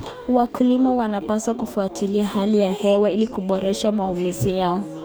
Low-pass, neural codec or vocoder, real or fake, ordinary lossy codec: none; codec, 44.1 kHz, 2.6 kbps, DAC; fake; none